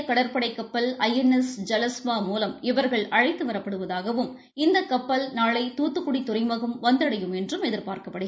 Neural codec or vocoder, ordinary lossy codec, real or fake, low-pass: none; none; real; none